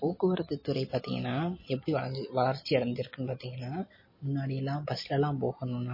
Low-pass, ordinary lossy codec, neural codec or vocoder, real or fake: 5.4 kHz; MP3, 24 kbps; none; real